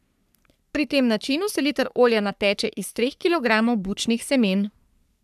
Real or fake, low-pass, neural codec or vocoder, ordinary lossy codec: fake; 14.4 kHz; codec, 44.1 kHz, 3.4 kbps, Pupu-Codec; none